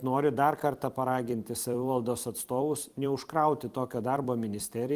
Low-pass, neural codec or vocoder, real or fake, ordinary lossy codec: 14.4 kHz; vocoder, 44.1 kHz, 128 mel bands every 256 samples, BigVGAN v2; fake; Opus, 24 kbps